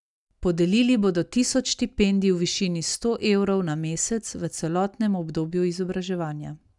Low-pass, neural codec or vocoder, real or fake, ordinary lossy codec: 10.8 kHz; none; real; none